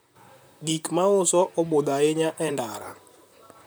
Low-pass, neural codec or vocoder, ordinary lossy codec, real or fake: none; vocoder, 44.1 kHz, 128 mel bands, Pupu-Vocoder; none; fake